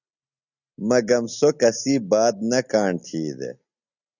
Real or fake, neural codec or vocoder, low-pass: real; none; 7.2 kHz